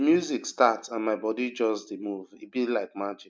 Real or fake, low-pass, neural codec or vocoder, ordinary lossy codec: real; none; none; none